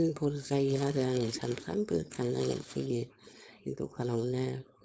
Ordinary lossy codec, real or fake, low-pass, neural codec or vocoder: none; fake; none; codec, 16 kHz, 4.8 kbps, FACodec